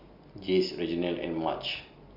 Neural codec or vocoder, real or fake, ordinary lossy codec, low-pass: none; real; AAC, 48 kbps; 5.4 kHz